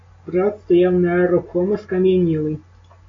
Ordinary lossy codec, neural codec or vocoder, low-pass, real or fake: AAC, 32 kbps; none; 7.2 kHz; real